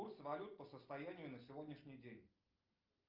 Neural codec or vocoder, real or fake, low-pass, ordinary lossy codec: none; real; 5.4 kHz; Opus, 24 kbps